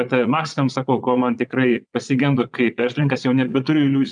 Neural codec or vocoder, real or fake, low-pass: vocoder, 22.05 kHz, 80 mel bands, Vocos; fake; 9.9 kHz